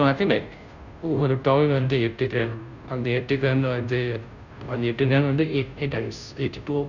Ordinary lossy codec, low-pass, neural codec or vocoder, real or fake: none; 7.2 kHz; codec, 16 kHz, 0.5 kbps, FunCodec, trained on Chinese and English, 25 frames a second; fake